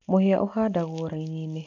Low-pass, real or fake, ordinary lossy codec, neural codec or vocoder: 7.2 kHz; real; none; none